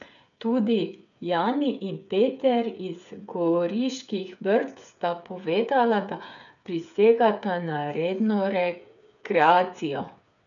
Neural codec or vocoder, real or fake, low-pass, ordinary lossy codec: codec, 16 kHz, 8 kbps, FreqCodec, smaller model; fake; 7.2 kHz; none